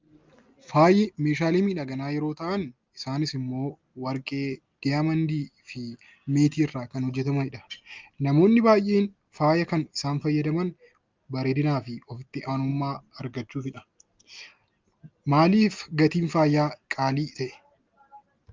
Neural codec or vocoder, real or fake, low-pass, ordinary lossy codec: none; real; 7.2 kHz; Opus, 32 kbps